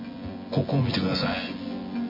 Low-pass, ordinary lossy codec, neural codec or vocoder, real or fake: 5.4 kHz; MP3, 24 kbps; vocoder, 24 kHz, 100 mel bands, Vocos; fake